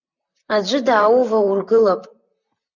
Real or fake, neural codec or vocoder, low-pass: fake; vocoder, 44.1 kHz, 128 mel bands, Pupu-Vocoder; 7.2 kHz